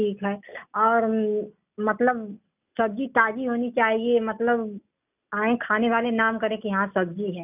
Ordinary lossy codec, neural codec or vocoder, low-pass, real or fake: none; none; 3.6 kHz; real